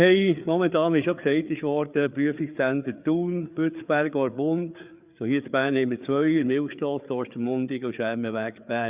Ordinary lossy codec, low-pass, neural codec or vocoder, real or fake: Opus, 64 kbps; 3.6 kHz; codec, 16 kHz, 4 kbps, FreqCodec, larger model; fake